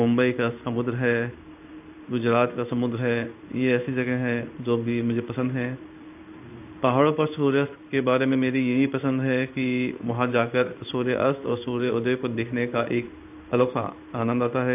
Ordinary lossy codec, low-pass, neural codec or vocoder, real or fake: AAC, 32 kbps; 3.6 kHz; codec, 16 kHz in and 24 kHz out, 1 kbps, XY-Tokenizer; fake